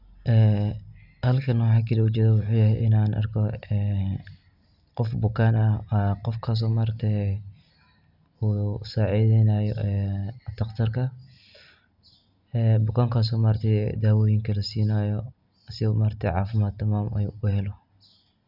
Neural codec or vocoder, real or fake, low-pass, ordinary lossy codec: none; real; 5.4 kHz; none